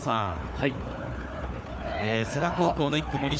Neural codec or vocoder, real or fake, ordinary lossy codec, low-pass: codec, 16 kHz, 4 kbps, FunCodec, trained on Chinese and English, 50 frames a second; fake; none; none